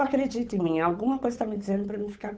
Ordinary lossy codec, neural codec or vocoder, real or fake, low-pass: none; codec, 16 kHz, 8 kbps, FunCodec, trained on Chinese and English, 25 frames a second; fake; none